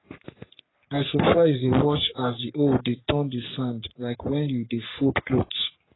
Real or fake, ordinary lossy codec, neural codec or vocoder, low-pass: fake; AAC, 16 kbps; codec, 44.1 kHz, 3.4 kbps, Pupu-Codec; 7.2 kHz